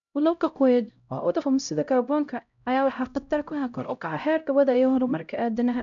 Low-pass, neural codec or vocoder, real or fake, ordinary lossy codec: 7.2 kHz; codec, 16 kHz, 0.5 kbps, X-Codec, HuBERT features, trained on LibriSpeech; fake; none